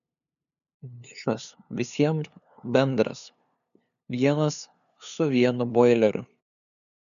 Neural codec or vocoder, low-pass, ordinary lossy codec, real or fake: codec, 16 kHz, 2 kbps, FunCodec, trained on LibriTTS, 25 frames a second; 7.2 kHz; MP3, 96 kbps; fake